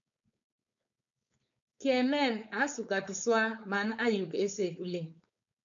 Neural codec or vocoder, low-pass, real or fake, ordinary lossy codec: codec, 16 kHz, 4.8 kbps, FACodec; 7.2 kHz; fake; AAC, 64 kbps